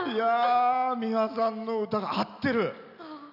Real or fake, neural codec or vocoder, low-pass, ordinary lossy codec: real; none; 5.4 kHz; none